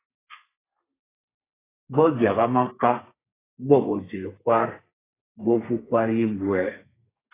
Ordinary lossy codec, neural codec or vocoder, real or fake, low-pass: AAC, 16 kbps; codec, 32 kHz, 1.9 kbps, SNAC; fake; 3.6 kHz